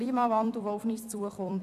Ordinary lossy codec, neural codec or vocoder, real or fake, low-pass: none; vocoder, 44.1 kHz, 128 mel bands every 256 samples, BigVGAN v2; fake; 14.4 kHz